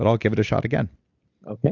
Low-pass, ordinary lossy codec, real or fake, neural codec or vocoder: 7.2 kHz; Opus, 64 kbps; real; none